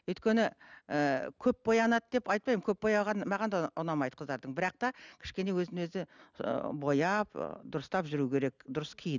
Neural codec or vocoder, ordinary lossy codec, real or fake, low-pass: none; none; real; 7.2 kHz